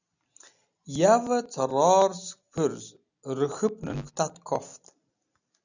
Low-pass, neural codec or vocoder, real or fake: 7.2 kHz; none; real